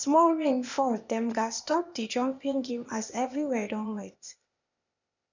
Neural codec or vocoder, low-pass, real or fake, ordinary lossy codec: codec, 16 kHz, 0.8 kbps, ZipCodec; 7.2 kHz; fake; none